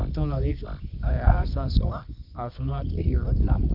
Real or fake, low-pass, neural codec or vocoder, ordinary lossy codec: fake; 5.4 kHz; codec, 24 kHz, 0.9 kbps, WavTokenizer, medium music audio release; none